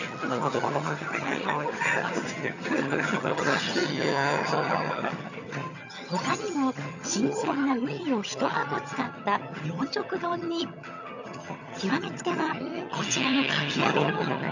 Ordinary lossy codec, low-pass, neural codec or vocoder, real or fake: none; 7.2 kHz; vocoder, 22.05 kHz, 80 mel bands, HiFi-GAN; fake